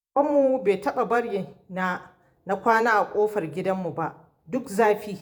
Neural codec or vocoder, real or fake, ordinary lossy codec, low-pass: vocoder, 48 kHz, 128 mel bands, Vocos; fake; none; none